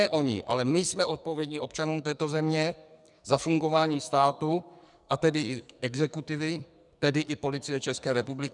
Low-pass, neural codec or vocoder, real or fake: 10.8 kHz; codec, 44.1 kHz, 2.6 kbps, SNAC; fake